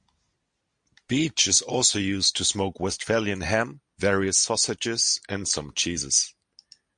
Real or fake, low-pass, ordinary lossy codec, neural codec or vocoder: real; 9.9 kHz; MP3, 64 kbps; none